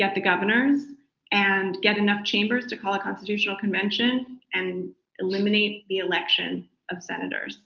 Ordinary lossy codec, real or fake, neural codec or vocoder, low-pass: Opus, 32 kbps; real; none; 7.2 kHz